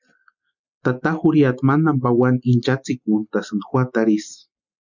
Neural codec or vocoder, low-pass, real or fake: none; 7.2 kHz; real